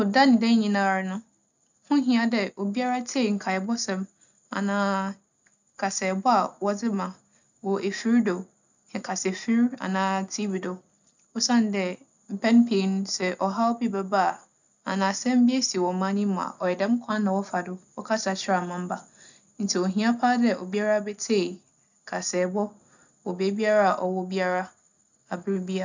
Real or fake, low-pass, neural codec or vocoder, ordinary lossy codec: real; 7.2 kHz; none; none